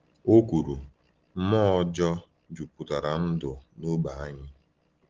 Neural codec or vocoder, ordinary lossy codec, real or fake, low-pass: none; Opus, 16 kbps; real; 7.2 kHz